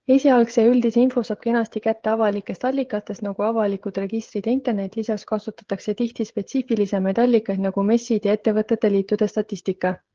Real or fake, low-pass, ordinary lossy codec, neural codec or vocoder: fake; 7.2 kHz; Opus, 16 kbps; codec, 16 kHz, 16 kbps, FreqCodec, smaller model